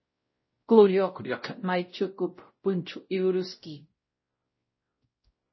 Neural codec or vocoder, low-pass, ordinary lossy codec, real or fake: codec, 16 kHz, 0.5 kbps, X-Codec, WavLM features, trained on Multilingual LibriSpeech; 7.2 kHz; MP3, 24 kbps; fake